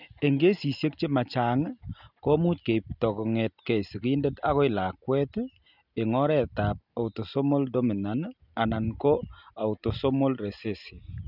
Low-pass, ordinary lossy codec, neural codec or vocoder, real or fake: 5.4 kHz; none; none; real